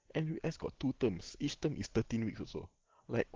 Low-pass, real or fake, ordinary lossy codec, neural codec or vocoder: 7.2 kHz; real; Opus, 16 kbps; none